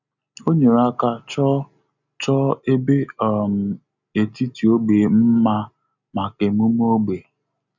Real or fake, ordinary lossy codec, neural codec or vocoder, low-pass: real; none; none; 7.2 kHz